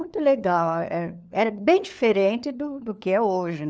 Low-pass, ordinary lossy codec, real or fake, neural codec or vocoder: none; none; fake; codec, 16 kHz, 4 kbps, FunCodec, trained on LibriTTS, 50 frames a second